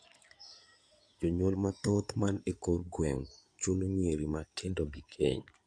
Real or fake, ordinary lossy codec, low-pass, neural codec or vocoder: fake; AAC, 64 kbps; 9.9 kHz; codec, 16 kHz in and 24 kHz out, 2.2 kbps, FireRedTTS-2 codec